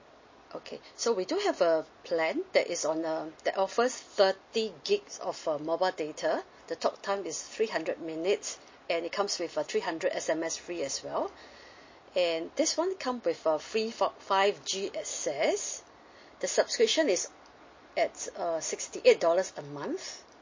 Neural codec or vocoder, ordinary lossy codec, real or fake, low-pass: none; MP3, 32 kbps; real; 7.2 kHz